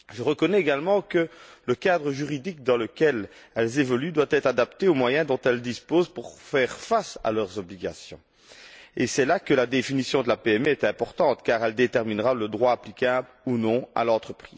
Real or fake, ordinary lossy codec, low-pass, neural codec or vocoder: real; none; none; none